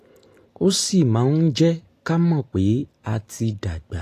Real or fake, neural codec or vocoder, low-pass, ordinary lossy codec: real; none; 14.4 kHz; AAC, 48 kbps